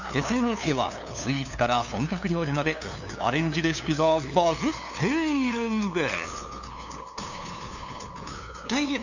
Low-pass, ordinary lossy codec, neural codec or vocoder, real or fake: 7.2 kHz; none; codec, 16 kHz, 2 kbps, FunCodec, trained on LibriTTS, 25 frames a second; fake